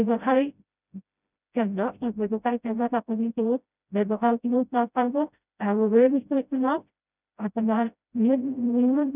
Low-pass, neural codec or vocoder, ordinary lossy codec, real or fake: 3.6 kHz; codec, 16 kHz, 0.5 kbps, FreqCodec, smaller model; none; fake